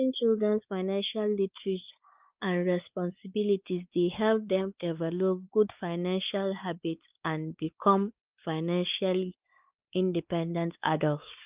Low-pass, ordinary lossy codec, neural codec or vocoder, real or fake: 3.6 kHz; Opus, 24 kbps; codec, 16 kHz, 4 kbps, X-Codec, WavLM features, trained on Multilingual LibriSpeech; fake